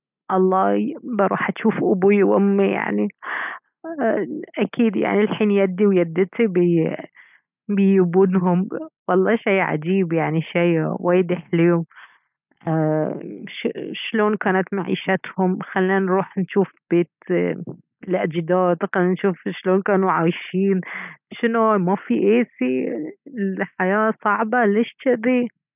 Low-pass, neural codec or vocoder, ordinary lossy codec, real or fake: 3.6 kHz; none; none; real